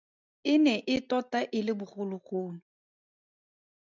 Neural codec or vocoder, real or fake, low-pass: none; real; 7.2 kHz